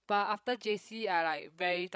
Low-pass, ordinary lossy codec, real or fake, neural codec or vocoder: none; none; fake; codec, 16 kHz, 16 kbps, FreqCodec, larger model